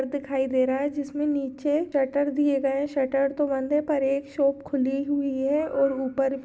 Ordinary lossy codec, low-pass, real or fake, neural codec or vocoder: none; none; real; none